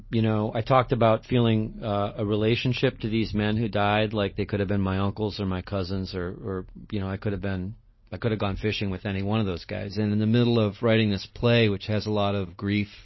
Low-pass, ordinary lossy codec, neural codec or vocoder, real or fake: 7.2 kHz; MP3, 24 kbps; none; real